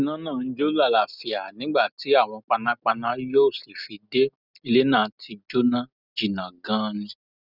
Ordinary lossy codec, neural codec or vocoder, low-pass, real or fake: none; none; 5.4 kHz; real